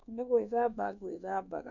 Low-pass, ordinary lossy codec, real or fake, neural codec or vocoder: 7.2 kHz; none; fake; codec, 24 kHz, 1.2 kbps, DualCodec